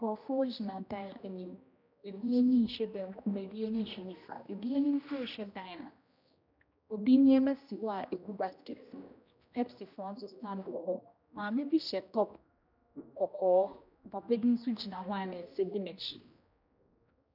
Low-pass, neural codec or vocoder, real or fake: 5.4 kHz; codec, 16 kHz, 1 kbps, X-Codec, HuBERT features, trained on general audio; fake